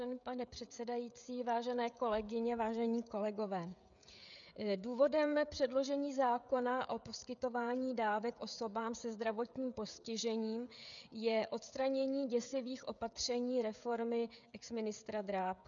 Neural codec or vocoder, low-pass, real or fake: codec, 16 kHz, 16 kbps, FreqCodec, smaller model; 7.2 kHz; fake